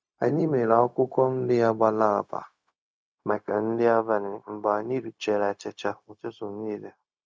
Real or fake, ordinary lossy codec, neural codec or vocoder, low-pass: fake; none; codec, 16 kHz, 0.4 kbps, LongCat-Audio-Codec; none